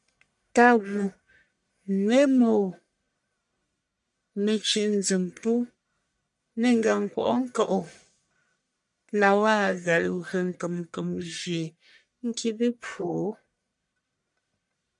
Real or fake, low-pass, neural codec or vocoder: fake; 10.8 kHz; codec, 44.1 kHz, 1.7 kbps, Pupu-Codec